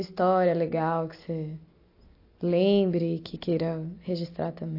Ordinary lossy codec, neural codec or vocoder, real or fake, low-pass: AAC, 32 kbps; none; real; 5.4 kHz